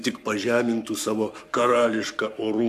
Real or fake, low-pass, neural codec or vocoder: fake; 14.4 kHz; codec, 44.1 kHz, 7.8 kbps, Pupu-Codec